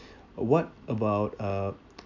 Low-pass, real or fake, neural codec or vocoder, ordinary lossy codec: 7.2 kHz; real; none; AAC, 48 kbps